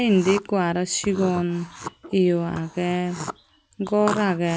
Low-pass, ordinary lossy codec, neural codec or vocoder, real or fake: none; none; none; real